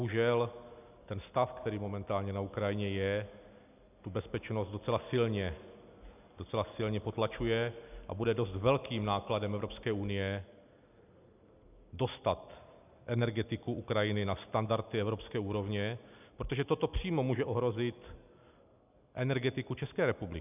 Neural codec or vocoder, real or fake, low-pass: none; real; 3.6 kHz